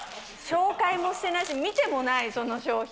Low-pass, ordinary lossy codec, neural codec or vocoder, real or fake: none; none; none; real